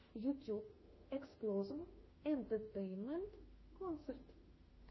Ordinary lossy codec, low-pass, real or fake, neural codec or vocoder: MP3, 24 kbps; 7.2 kHz; fake; autoencoder, 48 kHz, 32 numbers a frame, DAC-VAE, trained on Japanese speech